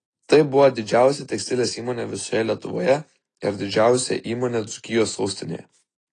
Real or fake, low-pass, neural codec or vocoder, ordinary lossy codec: real; 10.8 kHz; none; AAC, 32 kbps